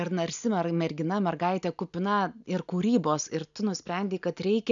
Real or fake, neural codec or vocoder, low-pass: real; none; 7.2 kHz